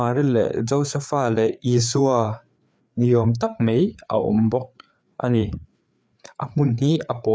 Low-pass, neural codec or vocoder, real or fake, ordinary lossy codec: none; codec, 16 kHz, 4 kbps, FreqCodec, larger model; fake; none